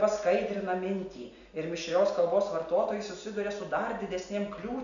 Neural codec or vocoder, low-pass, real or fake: none; 7.2 kHz; real